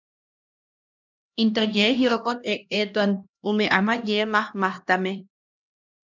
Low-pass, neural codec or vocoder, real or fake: 7.2 kHz; codec, 16 kHz, 1 kbps, X-Codec, HuBERT features, trained on LibriSpeech; fake